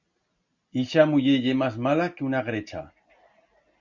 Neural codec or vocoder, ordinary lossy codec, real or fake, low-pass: none; Opus, 64 kbps; real; 7.2 kHz